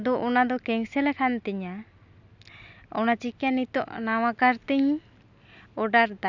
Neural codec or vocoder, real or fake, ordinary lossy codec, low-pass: none; real; none; 7.2 kHz